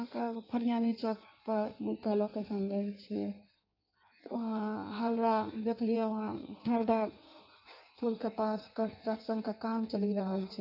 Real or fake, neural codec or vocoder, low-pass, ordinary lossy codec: fake; codec, 16 kHz in and 24 kHz out, 1.1 kbps, FireRedTTS-2 codec; 5.4 kHz; none